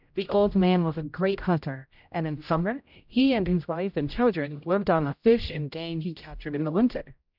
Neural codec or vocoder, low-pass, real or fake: codec, 16 kHz, 0.5 kbps, X-Codec, HuBERT features, trained on general audio; 5.4 kHz; fake